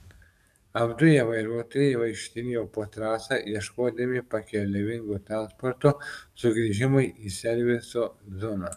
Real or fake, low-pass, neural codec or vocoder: fake; 14.4 kHz; codec, 44.1 kHz, 7.8 kbps, DAC